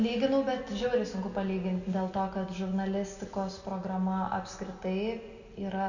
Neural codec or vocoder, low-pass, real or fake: none; 7.2 kHz; real